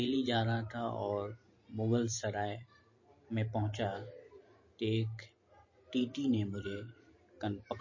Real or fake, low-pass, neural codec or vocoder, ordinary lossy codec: fake; 7.2 kHz; vocoder, 44.1 kHz, 128 mel bands every 512 samples, BigVGAN v2; MP3, 32 kbps